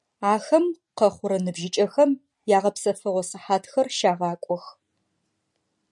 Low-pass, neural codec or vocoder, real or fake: 10.8 kHz; none; real